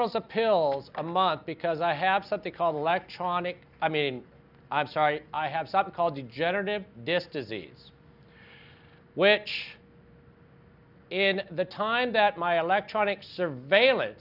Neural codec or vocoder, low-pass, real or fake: none; 5.4 kHz; real